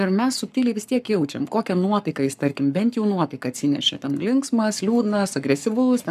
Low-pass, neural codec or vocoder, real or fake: 14.4 kHz; codec, 44.1 kHz, 7.8 kbps, Pupu-Codec; fake